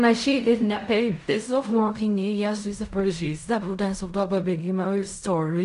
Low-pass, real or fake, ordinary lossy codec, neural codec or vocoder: 10.8 kHz; fake; AAC, 96 kbps; codec, 16 kHz in and 24 kHz out, 0.4 kbps, LongCat-Audio-Codec, fine tuned four codebook decoder